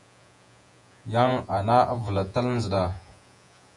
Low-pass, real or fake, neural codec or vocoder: 10.8 kHz; fake; vocoder, 48 kHz, 128 mel bands, Vocos